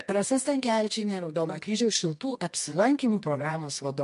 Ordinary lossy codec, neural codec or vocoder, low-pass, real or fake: MP3, 64 kbps; codec, 24 kHz, 0.9 kbps, WavTokenizer, medium music audio release; 10.8 kHz; fake